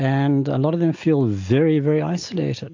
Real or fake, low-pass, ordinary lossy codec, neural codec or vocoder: real; 7.2 kHz; AAC, 48 kbps; none